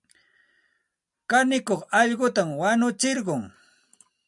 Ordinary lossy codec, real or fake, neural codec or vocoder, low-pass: MP3, 96 kbps; real; none; 10.8 kHz